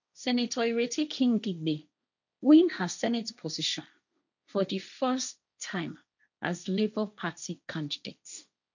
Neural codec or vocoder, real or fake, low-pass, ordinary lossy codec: codec, 16 kHz, 1.1 kbps, Voila-Tokenizer; fake; 7.2 kHz; none